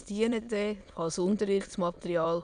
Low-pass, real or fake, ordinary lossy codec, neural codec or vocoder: 9.9 kHz; fake; none; autoencoder, 22.05 kHz, a latent of 192 numbers a frame, VITS, trained on many speakers